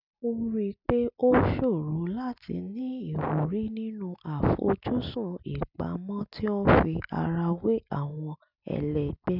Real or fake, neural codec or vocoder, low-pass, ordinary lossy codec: real; none; 5.4 kHz; none